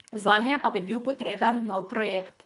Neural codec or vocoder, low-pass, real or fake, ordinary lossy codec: codec, 24 kHz, 1.5 kbps, HILCodec; 10.8 kHz; fake; none